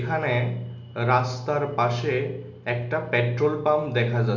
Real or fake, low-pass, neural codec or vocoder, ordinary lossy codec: real; 7.2 kHz; none; none